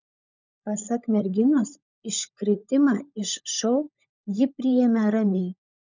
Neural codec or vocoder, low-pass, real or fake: codec, 16 kHz, 16 kbps, FunCodec, trained on LibriTTS, 50 frames a second; 7.2 kHz; fake